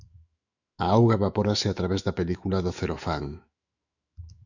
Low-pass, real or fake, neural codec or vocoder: 7.2 kHz; fake; autoencoder, 48 kHz, 128 numbers a frame, DAC-VAE, trained on Japanese speech